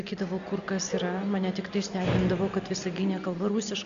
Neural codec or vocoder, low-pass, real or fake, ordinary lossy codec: none; 7.2 kHz; real; MP3, 64 kbps